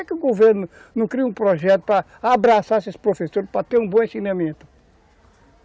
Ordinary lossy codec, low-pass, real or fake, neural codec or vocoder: none; none; real; none